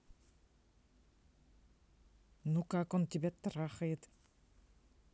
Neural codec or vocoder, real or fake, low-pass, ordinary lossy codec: none; real; none; none